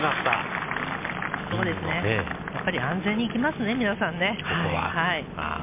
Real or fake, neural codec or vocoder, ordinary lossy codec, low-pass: real; none; MP3, 24 kbps; 3.6 kHz